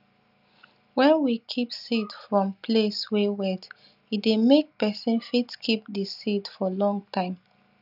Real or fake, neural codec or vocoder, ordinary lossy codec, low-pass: real; none; none; 5.4 kHz